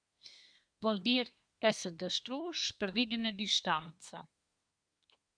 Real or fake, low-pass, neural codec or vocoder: fake; 9.9 kHz; codec, 24 kHz, 1 kbps, SNAC